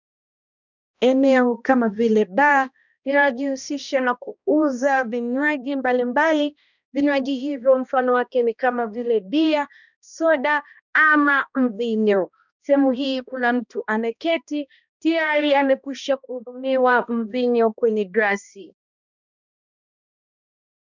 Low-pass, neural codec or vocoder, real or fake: 7.2 kHz; codec, 16 kHz, 1 kbps, X-Codec, HuBERT features, trained on balanced general audio; fake